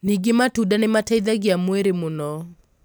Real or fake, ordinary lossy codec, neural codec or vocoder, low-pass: real; none; none; none